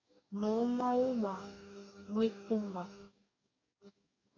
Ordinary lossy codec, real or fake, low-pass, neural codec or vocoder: AAC, 48 kbps; fake; 7.2 kHz; codec, 44.1 kHz, 2.6 kbps, DAC